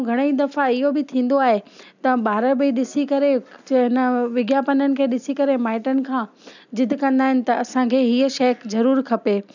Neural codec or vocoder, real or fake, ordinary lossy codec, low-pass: none; real; none; 7.2 kHz